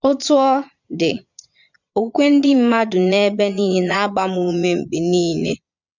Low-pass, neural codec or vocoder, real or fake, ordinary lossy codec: 7.2 kHz; vocoder, 22.05 kHz, 80 mel bands, Vocos; fake; none